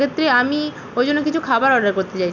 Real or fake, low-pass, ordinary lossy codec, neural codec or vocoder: real; 7.2 kHz; none; none